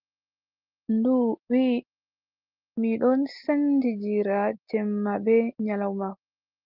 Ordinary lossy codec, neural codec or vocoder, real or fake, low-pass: Opus, 32 kbps; none; real; 5.4 kHz